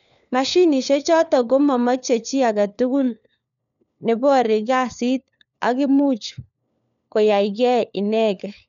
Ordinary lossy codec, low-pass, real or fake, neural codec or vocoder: none; 7.2 kHz; fake; codec, 16 kHz, 4 kbps, FunCodec, trained on LibriTTS, 50 frames a second